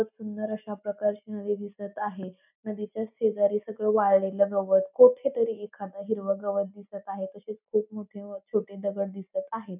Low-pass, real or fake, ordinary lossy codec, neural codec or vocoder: 3.6 kHz; real; none; none